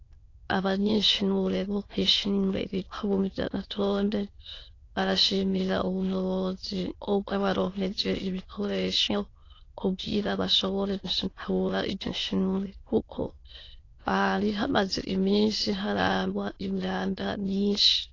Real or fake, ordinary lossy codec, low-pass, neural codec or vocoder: fake; AAC, 32 kbps; 7.2 kHz; autoencoder, 22.05 kHz, a latent of 192 numbers a frame, VITS, trained on many speakers